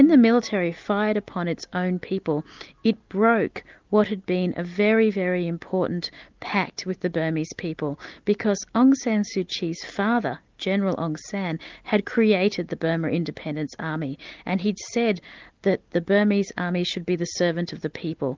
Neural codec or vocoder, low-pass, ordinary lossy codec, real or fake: none; 7.2 kHz; Opus, 32 kbps; real